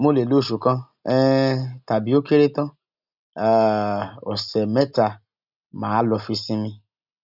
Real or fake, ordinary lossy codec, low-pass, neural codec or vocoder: real; none; 5.4 kHz; none